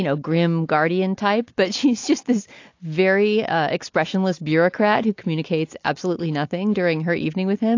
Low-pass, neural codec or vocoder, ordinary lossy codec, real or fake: 7.2 kHz; none; AAC, 48 kbps; real